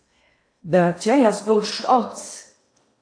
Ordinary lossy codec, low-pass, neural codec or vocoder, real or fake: AAC, 48 kbps; 9.9 kHz; codec, 16 kHz in and 24 kHz out, 0.8 kbps, FocalCodec, streaming, 65536 codes; fake